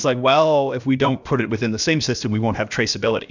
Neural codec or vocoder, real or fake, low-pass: codec, 16 kHz, about 1 kbps, DyCAST, with the encoder's durations; fake; 7.2 kHz